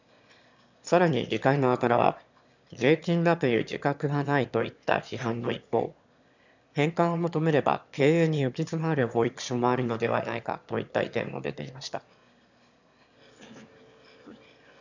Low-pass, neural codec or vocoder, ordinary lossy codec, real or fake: 7.2 kHz; autoencoder, 22.05 kHz, a latent of 192 numbers a frame, VITS, trained on one speaker; none; fake